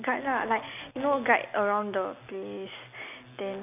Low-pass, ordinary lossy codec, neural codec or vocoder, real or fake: 3.6 kHz; none; none; real